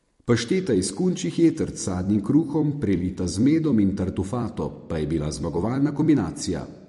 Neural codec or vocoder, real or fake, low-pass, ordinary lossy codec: vocoder, 44.1 kHz, 128 mel bands every 256 samples, BigVGAN v2; fake; 14.4 kHz; MP3, 48 kbps